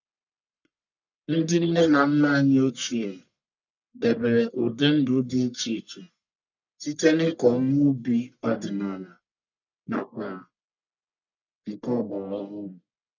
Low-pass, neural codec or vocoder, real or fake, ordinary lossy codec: 7.2 kHz; codec, 44.1 kHz, 1.7 kbps, Pupu-Codec; fake; none